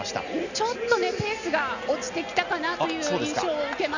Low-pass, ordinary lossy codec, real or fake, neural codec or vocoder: 7.2 kHz; none; real; none